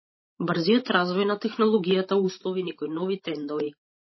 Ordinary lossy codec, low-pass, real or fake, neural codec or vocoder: MP3, 24 kbps; 7.2 kHz; fake; codec, 16 kHz, 16 kbps, FreqCodec, larger model